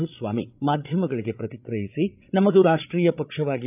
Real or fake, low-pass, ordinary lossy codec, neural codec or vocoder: fake; 3.6 kHz; none; codec, 16 kHz, 8 kbps, FreqCodec, larger model